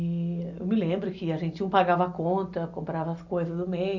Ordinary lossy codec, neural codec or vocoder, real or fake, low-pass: none; none; real; 7.2 kHz